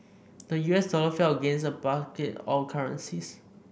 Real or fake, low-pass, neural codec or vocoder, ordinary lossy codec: real; none; none; none